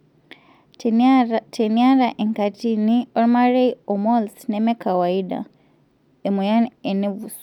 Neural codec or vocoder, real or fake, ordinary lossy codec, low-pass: none; real; none; 19.8 kHz